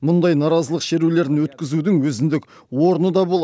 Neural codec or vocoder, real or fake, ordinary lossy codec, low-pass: none; real; none; none